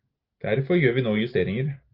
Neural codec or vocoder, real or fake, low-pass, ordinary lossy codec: none; real; 5.4 kHz; Opus, 24 kbps